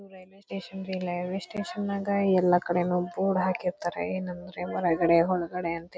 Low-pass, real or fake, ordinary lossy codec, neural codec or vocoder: none; real; none; none